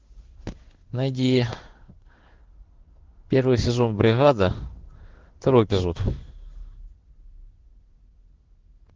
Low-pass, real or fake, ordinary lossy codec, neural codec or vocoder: 7.2 kHz; fake; Opus, 16 kbps; codec, 16 kHz in and 24 kHz out, 1 kbps, XY-Tokenizer